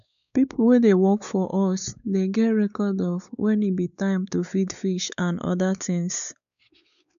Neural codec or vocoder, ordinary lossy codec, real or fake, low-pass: codec, 16 kHz, 4 kbps, X-Codec, WavLM features, trained on Multilingual LibriSpeech; none; fake; 7.2 kHz